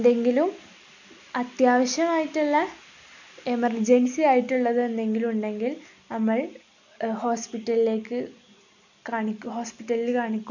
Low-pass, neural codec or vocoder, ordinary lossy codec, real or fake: 7.2 kHz; none; none; real